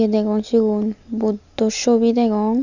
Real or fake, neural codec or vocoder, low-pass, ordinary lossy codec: real; none; 7.2 kHz; Opus, 64 kbps